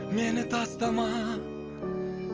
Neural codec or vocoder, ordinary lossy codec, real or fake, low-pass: none; Opus, 24 kbps; real; 7.2 kHz